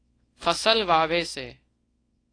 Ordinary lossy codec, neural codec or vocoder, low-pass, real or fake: AAC, 32 kbps; codec, 24 kHz, 0.9 kbps, WavTokenizer, small release; 9.9 kHz; fake